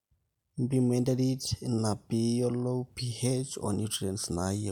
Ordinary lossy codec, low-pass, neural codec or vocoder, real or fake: none; 19.8 kHz; none; real